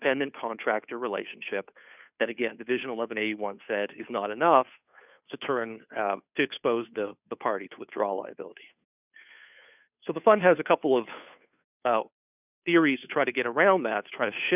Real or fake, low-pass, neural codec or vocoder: fake; 3.6 kHz; codec, 16 kHz, 2 kbps, FunCodec, trained on Chinese and English, 25 frames a second